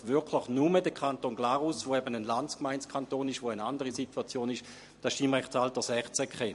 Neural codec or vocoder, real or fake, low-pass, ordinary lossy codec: vocoder, 44.1 kHz, 128 mel bands every 256 samples, BigVGAN v2; fake; 14.4 kHz; MP3, 48 kbps